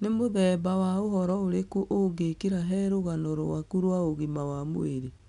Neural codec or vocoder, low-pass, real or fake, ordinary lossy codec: none; 10.8 kHz; real; none